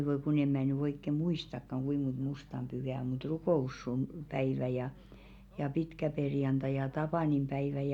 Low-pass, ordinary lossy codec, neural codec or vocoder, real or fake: 19.8 kHz; none; none; real